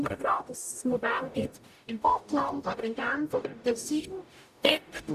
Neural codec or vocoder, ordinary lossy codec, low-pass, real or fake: codec, 44.1 kHz, 0.9 kbps, DAC; none; 14.4 kHz; fake